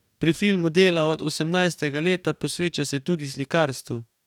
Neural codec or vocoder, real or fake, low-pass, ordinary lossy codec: codec, 44.1 kHz, 2.6 kbps, DAC; fake; 19.8 kHz; none